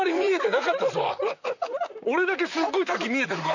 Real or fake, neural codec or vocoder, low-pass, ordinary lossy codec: fake; vocoder, 44.1 kHz, 128 mel bands, Pupu-Vocoder; 7.2 kHz; none